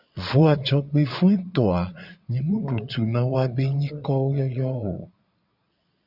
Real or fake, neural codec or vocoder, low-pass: fake; vocoder, 22.05 kHz, 80 mel bands, Vocos; 5.4 kHz